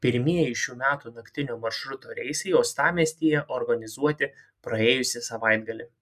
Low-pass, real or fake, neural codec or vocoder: 14.4 kHz; real; none